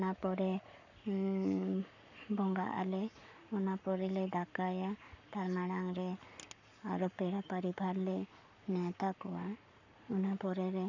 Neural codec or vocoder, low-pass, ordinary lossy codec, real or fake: codec, 44.1 kHz, 7.8 kbps, Pupu-Codec; 7.2 kHz; none; fake